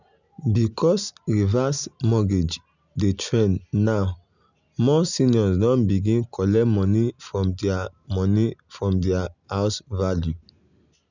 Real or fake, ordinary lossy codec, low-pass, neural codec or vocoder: real; none; 7.2 kHz; none